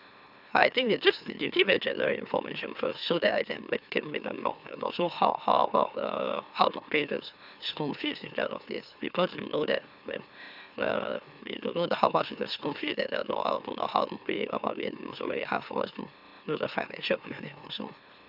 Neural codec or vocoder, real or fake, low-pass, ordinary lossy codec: autoencoder, 44.1 kHz, a latent of 192 numbers a frame, MeloTTS; fake; 5.4 kHz; none